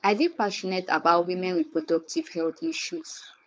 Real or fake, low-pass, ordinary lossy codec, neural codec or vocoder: fake; none; none; codec, 16 kHz, 4.8 kbps, FACodec